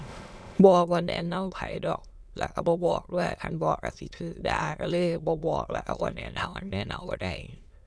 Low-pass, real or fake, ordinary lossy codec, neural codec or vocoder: none; fake; none; autoencoder, 22.05 kHz, a latent of 192 numbers a frame, VITS, trained on many speakers